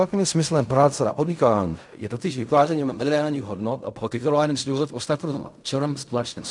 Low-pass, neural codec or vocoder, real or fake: 10.8 kHz; codec, 16 kHz in and 24 kHz out, 0.4 kbps, LongCat-Audio-Codec, fine tuned four codebook decoder; fake